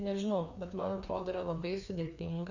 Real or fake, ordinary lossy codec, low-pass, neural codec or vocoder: fake; Opus, 64 kbps; 7.2 kHz; codec, 16 kHz, 2 kbps, FreqCodec, larger model